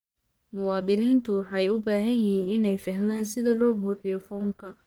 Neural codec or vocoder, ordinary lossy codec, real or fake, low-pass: codec, 44.1 kHz, 1.7 kbps, Pupu-Codec; none; fake; none